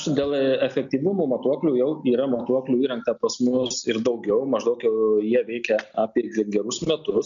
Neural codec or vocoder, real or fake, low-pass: none; real; 7.2 kHz